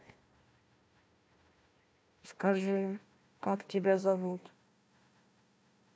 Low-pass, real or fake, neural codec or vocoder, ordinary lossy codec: none; fake; codec, 16 kHz, 1 kbps, FunCodec, trained on Chinese and English, 50 frames a second; none